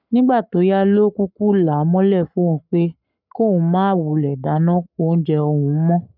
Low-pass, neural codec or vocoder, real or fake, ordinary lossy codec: 5.4 kHz; codec, 44.1 kHz, 7.8 kbps, Pupu-Codec; fake; none